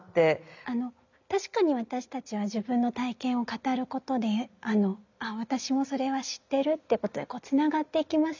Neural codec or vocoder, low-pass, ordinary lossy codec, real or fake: none; 7.2 kHz; none; real